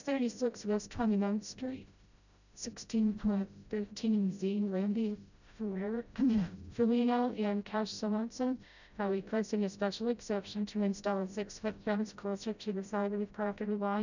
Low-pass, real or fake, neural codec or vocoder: 7.2 kHz; fake; codec, 16 kHz, 0.5 kbps, FreqCodec, smaller model